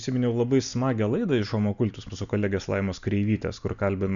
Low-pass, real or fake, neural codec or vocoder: 7.2 kHz; real; none